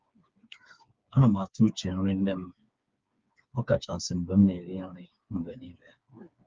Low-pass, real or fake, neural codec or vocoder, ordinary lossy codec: 7.2 kHz; fake; codec, 16 kHz, 4 kbps, FreqCodec, smaller model; Opus, 32 kbps